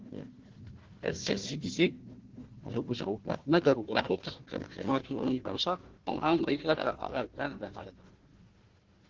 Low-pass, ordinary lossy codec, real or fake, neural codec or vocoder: 7.2 kHz; Opus, 16 kbps; fake; codec, 16 kHz, 1 kbps, FunCodec, trained on Chinese and English, 50 frames a second